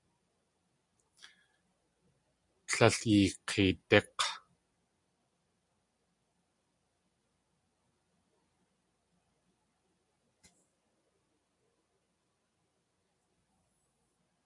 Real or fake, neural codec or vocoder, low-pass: real; none; 10.8 kHz